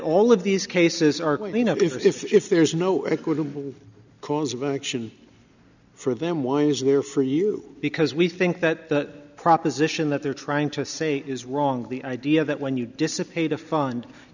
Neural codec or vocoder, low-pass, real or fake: none; 7.2 kHz; real